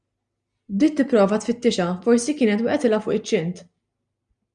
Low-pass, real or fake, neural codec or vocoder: 9.9 kHz; real; none